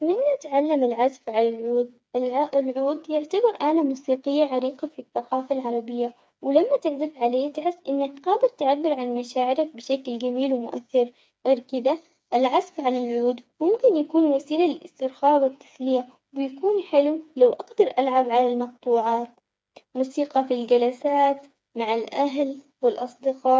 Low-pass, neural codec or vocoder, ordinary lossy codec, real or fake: none; codec, 16 kHz, 4 kbps, FreqCodec, smaller model; none; fake